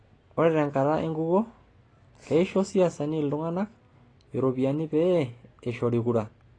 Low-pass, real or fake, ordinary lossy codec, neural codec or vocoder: 9.9 kHz; real; AAC, 32 kbps; none